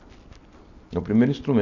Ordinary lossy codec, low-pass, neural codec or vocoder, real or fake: none; 7.2 kHz; none; real